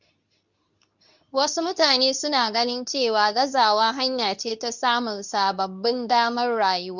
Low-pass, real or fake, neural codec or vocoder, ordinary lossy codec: 7.2 kHz; fake; codec, 24 kHz, 0.9 kbps, WavTokenizer, medium speech release version 2; none